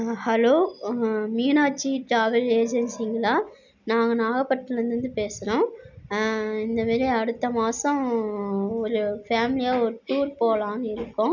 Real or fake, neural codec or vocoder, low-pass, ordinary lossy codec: real; none; 7.2 kHz; none